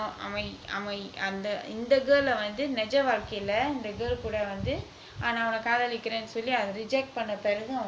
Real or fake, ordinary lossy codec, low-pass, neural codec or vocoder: real; none; none; none